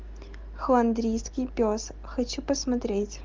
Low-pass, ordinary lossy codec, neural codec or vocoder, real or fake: 7.2 kHz; Opus, 24 kbps; none; real